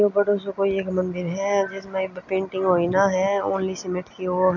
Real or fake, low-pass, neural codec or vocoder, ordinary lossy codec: real; 7.2 kHz; none; none